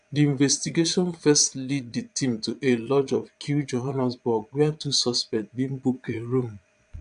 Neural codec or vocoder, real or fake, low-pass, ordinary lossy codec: vocoder, 22.05 kHz, 80 mel bands, Vocos; fake; 9.9 kHz; none